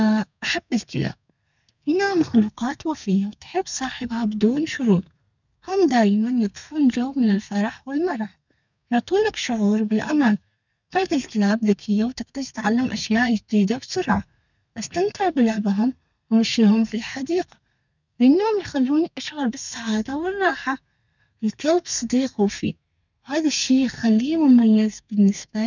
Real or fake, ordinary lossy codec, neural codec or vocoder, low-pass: fake; none; codec, 32 kHz, 1.9 kbps, SNAC; 7.2 kHz